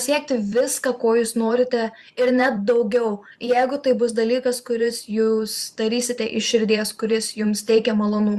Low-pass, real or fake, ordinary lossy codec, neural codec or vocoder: 14.4 kHz; fake; Opus, 64 kbps; vocoder, 44.1 kHz, 128 mel bands every 512 samples, BigVGAN v2